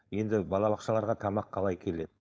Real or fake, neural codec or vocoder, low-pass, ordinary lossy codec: fake; codec, 16 kHz, 4.8 kbps, FACodec; none; none